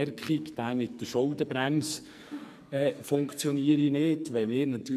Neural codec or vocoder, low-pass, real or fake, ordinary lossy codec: codec, 44.1 kHz, 2.6 kbps, SNAC; 14.4 kHz; fake; none